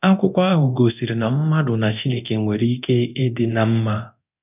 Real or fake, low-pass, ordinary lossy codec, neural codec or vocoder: fake; 3.6 kHz; none; codec, 24 kHz, 0.9 kbps, DualCodec